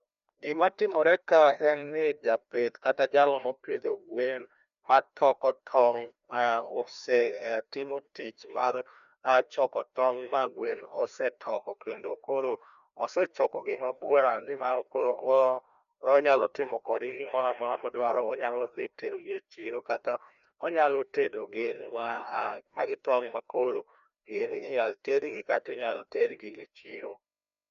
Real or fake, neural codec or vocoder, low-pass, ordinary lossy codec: fake; codec, 16 kHz, 1 kbps, FreqCodec, larger model; 7.2 kHz; none